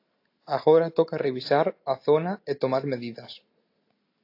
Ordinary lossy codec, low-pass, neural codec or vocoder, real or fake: AAC, 32 kbps; 5.4 kHz; none; real